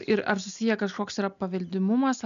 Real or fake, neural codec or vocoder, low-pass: real; none; 7.2 kHz